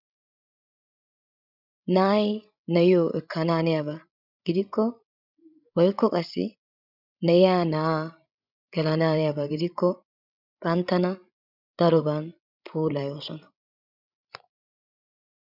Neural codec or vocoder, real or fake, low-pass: codec, 16 kHz, 16 kbps, FreqCodec, larger model; fake; 5.4 kHz